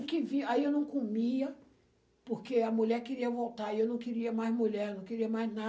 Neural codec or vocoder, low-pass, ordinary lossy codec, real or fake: none; none; none; real